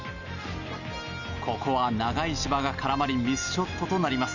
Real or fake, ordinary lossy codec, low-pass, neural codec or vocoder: real; none; 7.2 kHz; none